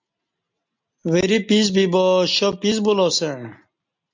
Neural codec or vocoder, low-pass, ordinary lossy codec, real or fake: none; 7.2 kHz; MP3, 64 kbps; real